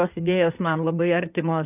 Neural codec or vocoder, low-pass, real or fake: codec, 16 kHz in and 24 kHz out, 2.2 kbps, FireRedTTS-2 codec; 3.6 kHz; fake